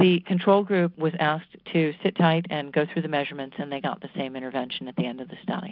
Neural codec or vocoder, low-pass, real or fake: none; 5.4 kHz; real